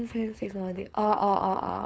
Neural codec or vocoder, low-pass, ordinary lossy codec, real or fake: codec, 16 kHz, 4.8 kbps, FACodec; none; none; fake